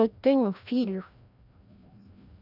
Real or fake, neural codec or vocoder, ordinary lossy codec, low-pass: fake; codec, 16 kHz, 1 kbps, FreqCodec, larger model; none; 5.4 kHz